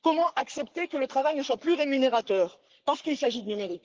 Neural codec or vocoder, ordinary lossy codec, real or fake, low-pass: codec, 44.1 kHz, 3.4 kbps, Pupu-Codec; Opus, 16 kbps; fake; 7.2 kHz